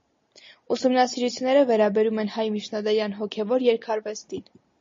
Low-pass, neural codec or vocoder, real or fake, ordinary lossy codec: 7.2 kHz; none; real; MP3, 32 kbps